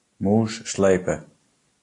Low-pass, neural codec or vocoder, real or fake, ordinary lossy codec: 10.8 kHz; none; real; AAC, 48 kbps